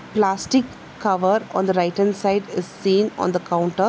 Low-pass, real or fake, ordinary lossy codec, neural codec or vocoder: none; real; none; none